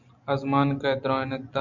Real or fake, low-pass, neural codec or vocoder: real; 7.2 kHz; none